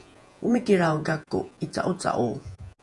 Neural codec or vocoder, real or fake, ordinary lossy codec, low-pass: vocoder, 48 kHz, 128 mel bands, Vocos; fake; MP3, 96 kbps; 10.8 kHz